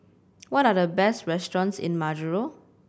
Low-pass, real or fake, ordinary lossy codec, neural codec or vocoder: none; real; none; none